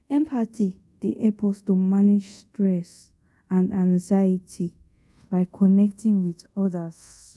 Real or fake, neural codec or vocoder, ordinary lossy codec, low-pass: fake; codec, 24 kHz, 0.5 kbps, DualCodec; none; none